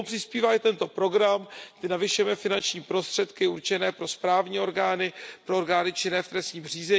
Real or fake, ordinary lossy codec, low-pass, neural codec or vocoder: real; none; none; none